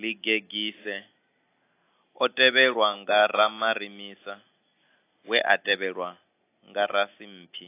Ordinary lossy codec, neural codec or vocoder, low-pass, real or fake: AAC, 24 kbps; none; 3.6 kHz; real